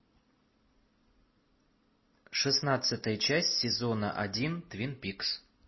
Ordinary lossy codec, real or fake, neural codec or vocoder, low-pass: MP3, 24 kbps; real; none; 7.2 kHz